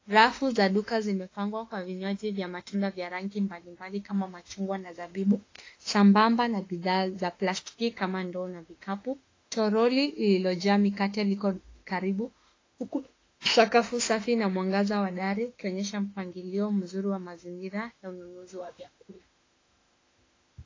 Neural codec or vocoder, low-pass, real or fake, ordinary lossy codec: autoencoder, 48 kHz, 32 numbers a frame, DAC-VAE, trained on Japanese speech; 7.2 kHz; fake; AAC, 32 kbps